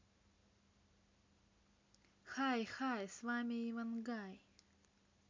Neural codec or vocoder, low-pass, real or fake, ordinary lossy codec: none; 7.2 kHz; real; MP3, 64 kbps